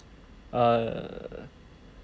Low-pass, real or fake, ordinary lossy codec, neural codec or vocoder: none; real; none; none